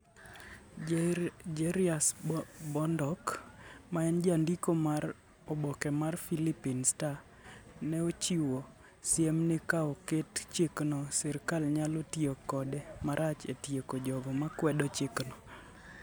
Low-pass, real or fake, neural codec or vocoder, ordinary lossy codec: none; real; none; none